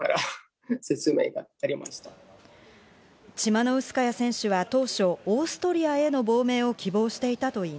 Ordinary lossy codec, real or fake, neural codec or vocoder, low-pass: none; real; none; none